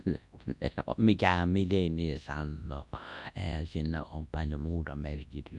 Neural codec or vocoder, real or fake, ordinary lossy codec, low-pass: codec, 24 kHz, 0.9 kbps, WavTokenizer, large speech release; fake; MP3, 96 kbps; 10.8 kHz